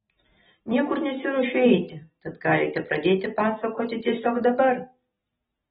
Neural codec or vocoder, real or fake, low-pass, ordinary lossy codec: none; real; 19.8 kHz; AAC, 16 kbps